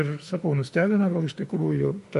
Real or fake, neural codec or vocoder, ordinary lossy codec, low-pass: fake; autoencoder, 48 kHz, 32 numbers a frame, DAC-VAE, trained on Japanese speech; MP3, 48 kbps; 14.4 kHz